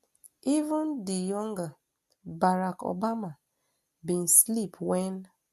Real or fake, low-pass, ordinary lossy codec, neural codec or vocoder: real; 14.4 kHz; MP3, 64 kbps; none